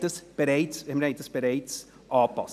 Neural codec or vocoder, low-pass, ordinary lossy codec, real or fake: none; 14.4 kHz; none; real